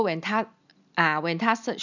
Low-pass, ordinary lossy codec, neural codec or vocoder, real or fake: 7.2 kHz; none; none; real